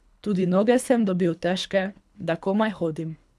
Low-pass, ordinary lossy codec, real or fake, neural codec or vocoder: none; none; fake; codec, 24 kHz, 3 kbps, HILCodec